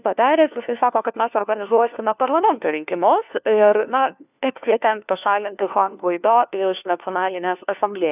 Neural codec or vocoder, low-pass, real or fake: codec, 16 kHz, 1 kbps, FunCodec, trained on LibriTTS, 50 frames a second; 3.6 kHz; fake